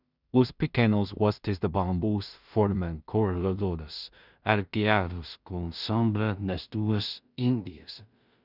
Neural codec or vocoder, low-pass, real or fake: codec, 16 kHz in and 24 kHz out, 0.4 kbps, LongCat-Audio-Codec, two codebook decoder; 5.4 kHz; fake